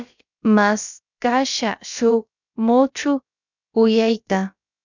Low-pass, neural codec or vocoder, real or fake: 7.2 kHz; codec, 16 kHz, about 1 kbps, DyCAST, with the encoder's durations; fake